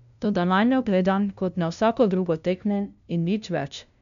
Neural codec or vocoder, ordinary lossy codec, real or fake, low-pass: codec, 16 kHz, 0.5 kbps, FunCodec, trained on LibriTTS, 25 frames a second; none; fake; 7.2 kHz